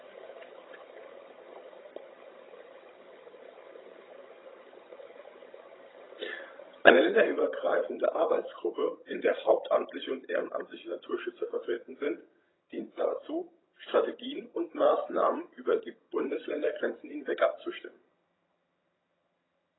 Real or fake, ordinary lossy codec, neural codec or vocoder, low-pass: fake; AAC, 16 kbps; vocoder, 22.05 kHz, 80 mel bands, HiFi-GAN; 7.2 kHz